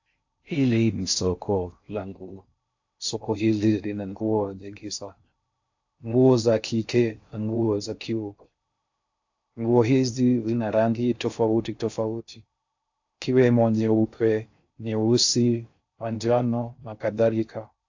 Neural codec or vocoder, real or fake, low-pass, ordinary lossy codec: codec, 16 kHz in and 24 kHz out, 0.6 kbps, FocalCodec, streaming, 4096 codes; fake; 7.2 kHz; AAC, 48 kbps